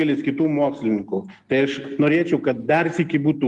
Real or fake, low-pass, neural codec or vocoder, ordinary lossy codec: real; 10.8 kHz; none; Opus, 24 kbps